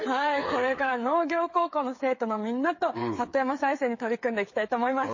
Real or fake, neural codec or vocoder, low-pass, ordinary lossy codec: fake; codec, 16 kHz, 8 kbps, FreqCodec, smaller model; 7.2 kHz; MP3, 32 kbps